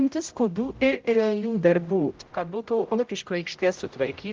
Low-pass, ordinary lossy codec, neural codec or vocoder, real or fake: 7.2 kHz; Opus, 32 kbps; codec, 16 kHz, 0.5 kbps, X-Codec, HuBERT features, trained on general audio; fake